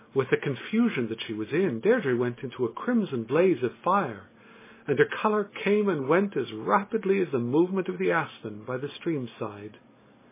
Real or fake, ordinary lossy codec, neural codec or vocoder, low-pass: real; MP3, 16 kbps; none; 3.6 kHz